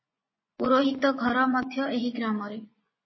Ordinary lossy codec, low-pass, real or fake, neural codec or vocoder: MP3, 24 kbps; 7.2 kHz; fake; vocoder, 24 kHz, 100 mel bands, Vocos